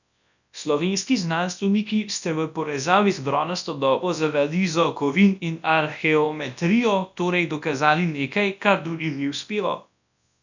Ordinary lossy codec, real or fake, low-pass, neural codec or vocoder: none; fake; 7.2 kHz; codec, 24 kHz, 0.9 kbps, WavTokenizer, large speech release